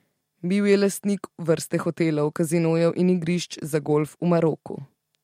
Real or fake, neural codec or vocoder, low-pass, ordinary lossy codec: real; none; 19.8 kHz; MP3, 64 kbps